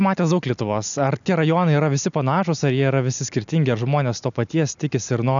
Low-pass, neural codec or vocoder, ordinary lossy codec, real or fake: 7.2 kHz; none; MP3, 96 kbps; real